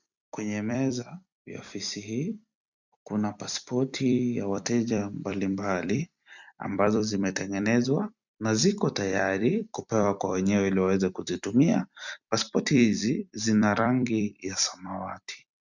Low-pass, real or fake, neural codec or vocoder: 7.2 kHz; fake; vocoder, 44.1 kHz, 128 mel bands every 256 samples, BigVGAN v2